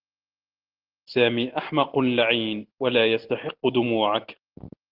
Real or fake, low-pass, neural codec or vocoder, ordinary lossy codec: real; 5.4 kHz; none; Opus, 16 kbps